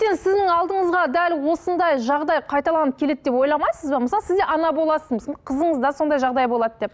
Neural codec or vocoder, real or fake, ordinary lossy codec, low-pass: none; real; none; none